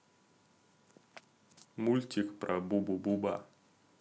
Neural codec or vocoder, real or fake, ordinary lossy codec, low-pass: none; real; none; none